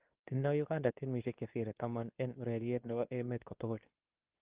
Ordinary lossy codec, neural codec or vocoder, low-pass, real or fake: Opus, 32 kbps; codec, 24 kHz, 0.9 kbps, WavTokenizer, medium speech release version 2; 3.6 kHz; fake